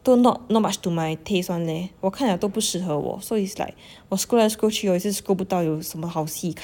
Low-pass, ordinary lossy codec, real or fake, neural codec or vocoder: none; none; real; none